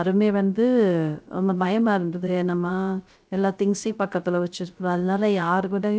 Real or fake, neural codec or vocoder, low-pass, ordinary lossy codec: fake; codec, 16 kHz, 0.3 kbps, FocalCodec; none; none